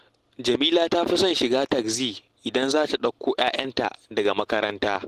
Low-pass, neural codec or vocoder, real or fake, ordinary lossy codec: 14.4 kHz; none; real; Opus, 16 kbps